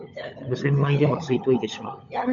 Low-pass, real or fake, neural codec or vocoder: 7.2 kHz; fake; codec, 16 kHz, 16 kbps, FunCodec, trained on LibriTTS, 50 frames a second